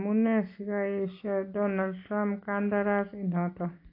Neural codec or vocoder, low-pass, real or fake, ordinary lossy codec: none; 5.4 kHz; real; AAC, 32 kbps